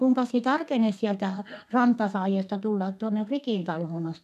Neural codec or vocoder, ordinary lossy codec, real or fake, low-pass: codec, 32 kHz, 1.9 kbps, SNAC; none; fake; 14.4 kHz